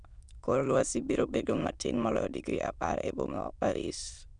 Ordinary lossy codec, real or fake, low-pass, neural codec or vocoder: none; fake; 9.9 kHz; autoencoder, 22.05 kHz, a latent of 192 numbers a frame, VITS, trained on many speakers